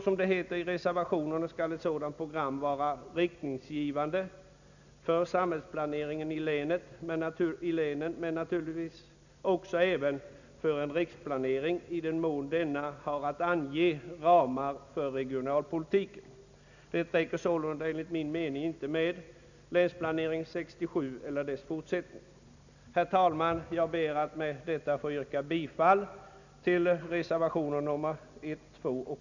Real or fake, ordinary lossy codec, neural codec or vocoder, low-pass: real; none; none; 7.2 kHz